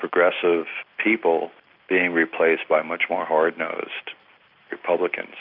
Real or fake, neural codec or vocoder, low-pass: real; none; 5.4 kHz